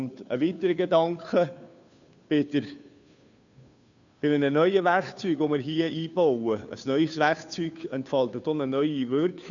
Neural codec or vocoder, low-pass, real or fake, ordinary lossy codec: codec, 16 kHz, 2 kbps, FunCodec, trained on Chinese and English, 25 frames a second; 7.2 kHz; fake; none